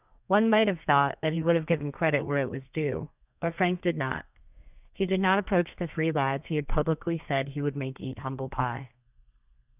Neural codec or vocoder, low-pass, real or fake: codec, 32 kHz, 1.9 kbps, SNAC; 3.6 kHz; fake